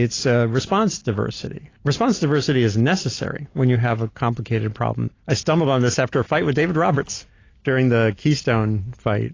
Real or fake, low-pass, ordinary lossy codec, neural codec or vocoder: real; 7.2 kHz; AAC, 32 kbps; none